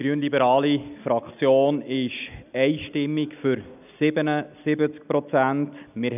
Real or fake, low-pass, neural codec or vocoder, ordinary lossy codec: real; 3.6 kHz; none; none